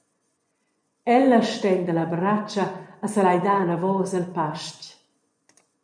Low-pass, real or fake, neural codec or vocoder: 9.9 kHz; fake; vocoder, 24 kHz, 100 mel bands, Vocos